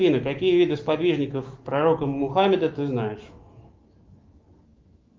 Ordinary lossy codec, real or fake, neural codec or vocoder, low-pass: Opus, 32 kbps; fake; autoencoder, 48 kHz, 128 numbers a frame, DAC-VAE, trained on Japanese speech; 7.2 kHz